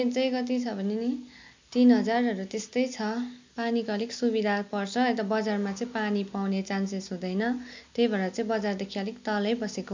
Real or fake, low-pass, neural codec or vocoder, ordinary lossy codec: real; 7.2 kHz; none; MP3, 64 kbps